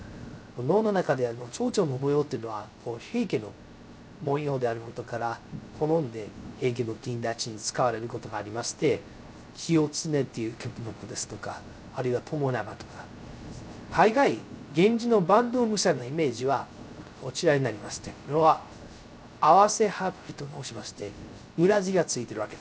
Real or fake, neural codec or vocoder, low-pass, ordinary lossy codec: fake; codec, 16 kHz, 0.3 kbps, FocalCodec; none; none